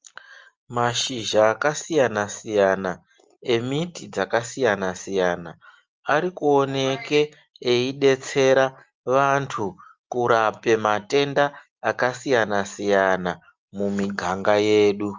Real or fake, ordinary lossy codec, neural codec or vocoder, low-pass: real; Opus, 24 kbps; none; 7.2 kHz